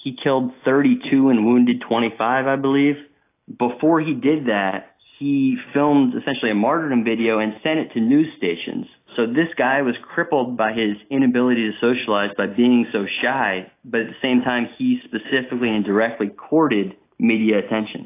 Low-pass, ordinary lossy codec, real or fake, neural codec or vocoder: 3.6 kHz; AAC, 24 kbps; real; none